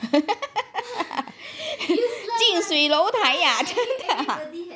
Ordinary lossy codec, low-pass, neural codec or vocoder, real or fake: none; none; none; real